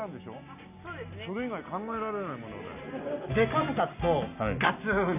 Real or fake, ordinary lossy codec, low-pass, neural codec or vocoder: real; none; 3.6 kHz; none